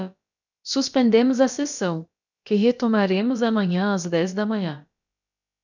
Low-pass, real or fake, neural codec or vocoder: 7.2 kHz; fake; codec, 16 kHz, about 1 kbps, DyCAST, with the encoder's durations